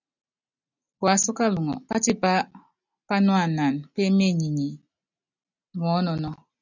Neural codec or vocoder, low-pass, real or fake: none; 7.2 kHz; real